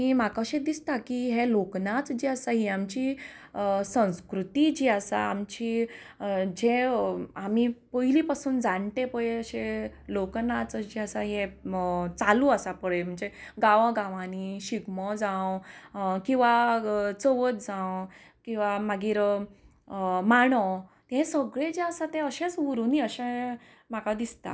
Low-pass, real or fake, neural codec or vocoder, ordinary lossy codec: none; real; none; none